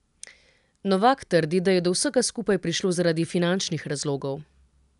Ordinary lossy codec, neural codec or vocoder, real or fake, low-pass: none; none; real; 10.8 kHz